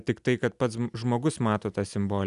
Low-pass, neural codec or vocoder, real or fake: 10.8 kHz; none; real